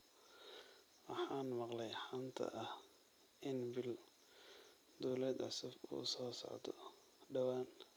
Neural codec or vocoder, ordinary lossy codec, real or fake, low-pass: vocoder, 44.1 kHz, 128 mel bands every 256 samples, BigVGAN v2; none; fake; none